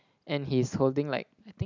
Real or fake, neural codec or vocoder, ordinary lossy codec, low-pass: real; none; none; 7.2 kHz